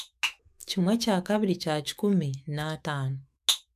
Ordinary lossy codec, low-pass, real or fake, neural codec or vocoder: none; 14.4 kHz; fake; autoencoder, 48 kHz, 128 numbers a frame, DAC-VAE, trained on Japanese speech